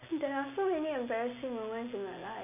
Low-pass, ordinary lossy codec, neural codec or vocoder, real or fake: 3.6 kHz; none; none; real